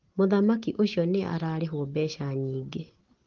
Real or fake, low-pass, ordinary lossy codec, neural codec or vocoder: real; 7.2 kHz; Opus, 16 kbps; none